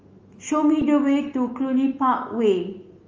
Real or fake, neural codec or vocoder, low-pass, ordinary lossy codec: fake; autoencoder, 48 kHz, 128 numbers a frame, DAC-VAE, trained on Japanese speech; 7.2 kHz; Opus, 24 kbps